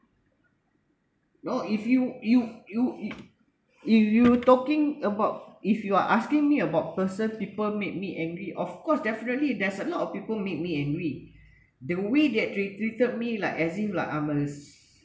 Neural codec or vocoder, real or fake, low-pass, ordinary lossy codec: none; real; none; none